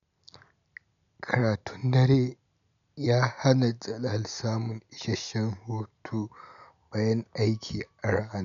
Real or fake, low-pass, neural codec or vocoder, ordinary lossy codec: real; 7.2 kHz; none; none